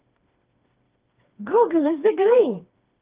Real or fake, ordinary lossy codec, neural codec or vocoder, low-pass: fake; Opus, 32 kbps; codec, 16 kHz, 2 kbps, FreqCodec, smaller model; 3.6 kHz